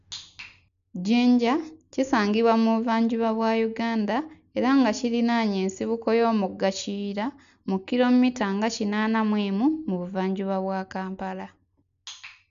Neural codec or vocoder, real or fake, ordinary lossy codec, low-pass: none; real; none; 7.2 kHz